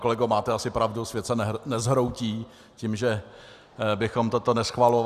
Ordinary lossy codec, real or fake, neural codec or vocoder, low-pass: Opus, 64 kbps; real; none; 14.4 kHz